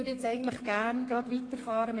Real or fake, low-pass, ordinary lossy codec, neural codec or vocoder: fake; 9.9 kHz; AAC, 32 kbps; codec, 32 kHz, 1.9 kbps, SNAC